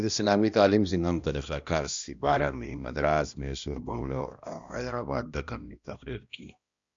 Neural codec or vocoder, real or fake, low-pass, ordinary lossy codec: codec, 16 kHz, 1 kbps, X-Codec, HuBERT features, trained on balanced general audio; fake; 7.2 kHz; Opus, 64 kbps